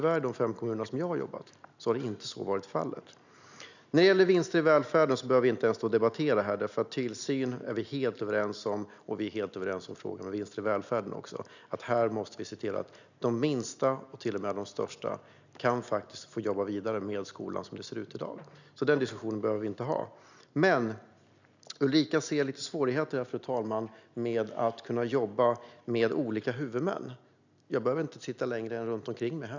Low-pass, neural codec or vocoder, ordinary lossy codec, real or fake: 7.2 kHz; none; none; real